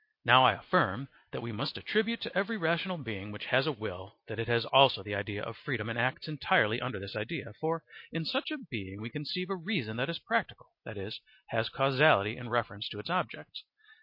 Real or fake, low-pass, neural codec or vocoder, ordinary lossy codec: real; 5.4 kHz; none; MP3, 32 kbps